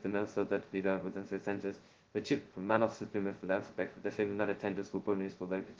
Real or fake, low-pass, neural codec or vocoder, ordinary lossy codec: fake; 7.2 kHz; codec, 16 kHz, 0.2 kbps, FocalCodec; Opus, 16 kbps